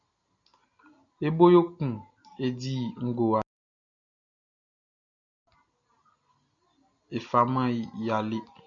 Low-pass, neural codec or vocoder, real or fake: 7.2 kHz; none; real